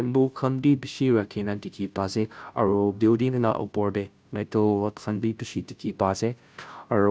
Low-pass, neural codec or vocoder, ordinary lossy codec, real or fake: none; codec, 16 kHz, 0.5 kbps, FunCodec, trained on Chinese and English, 25 frames a second; none; fake